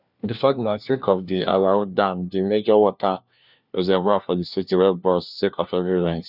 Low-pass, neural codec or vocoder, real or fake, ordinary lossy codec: 5.4 kHz; codec, 16 kHz, 1 kbps, FunCodec, trained on LibriTTS, 50 frames a second; fake; none